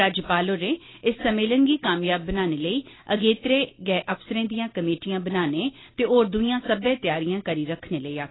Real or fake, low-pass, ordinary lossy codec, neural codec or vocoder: real; 7.2 kHz; AAC, 16 kbps; none